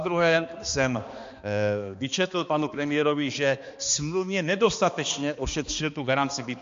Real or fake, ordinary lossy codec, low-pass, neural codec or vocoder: fake; MP3, 48 kbps; 7.2 kHz; codec, 16 kHz, 2 kbps, X-Codec, HuBERT features, trained on balanced general audio